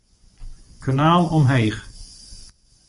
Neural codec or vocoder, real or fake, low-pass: vocoder, 24 kHz, 100 mel bands, Vocos; fake; 10.8 kHz